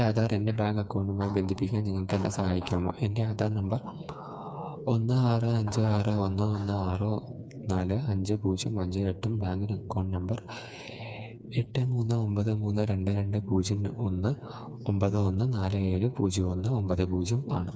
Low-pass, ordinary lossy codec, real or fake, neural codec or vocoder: none; none; fake; codec, 16 kHz, 4 kbps, FreqCodec, smaller model